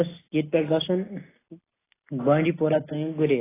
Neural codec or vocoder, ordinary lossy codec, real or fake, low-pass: none; AAC, 16 kbps; real; 3.6 kHz